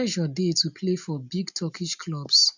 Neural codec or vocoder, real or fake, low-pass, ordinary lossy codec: none; real; 7.2 kHz; none